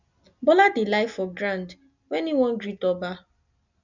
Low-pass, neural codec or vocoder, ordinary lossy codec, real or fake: 7.2 kHz; none; none; real